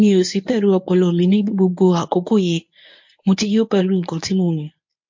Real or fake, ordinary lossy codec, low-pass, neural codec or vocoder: fake; MP3, 48 kbps; 7.2 kHz; codec, 24 kHz, 0.9 kbps, WavTokenizer, medium speech release version 1